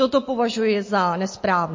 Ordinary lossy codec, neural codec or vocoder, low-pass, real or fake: MP3, 32 kbps; none; 7.2 kHz; real